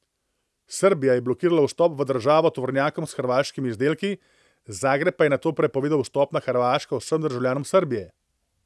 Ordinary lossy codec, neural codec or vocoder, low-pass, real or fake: none; none; none; real